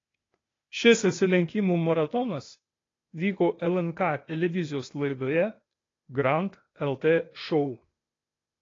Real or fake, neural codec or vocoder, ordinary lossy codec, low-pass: fake; codec, 16 kHz, 0.8 kbps, ZipCodec; AAC, 32 kbps; 7.2 kHz